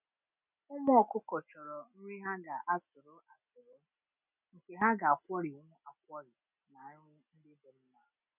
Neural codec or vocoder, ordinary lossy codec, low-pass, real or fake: none; none; 3.6 kHz; real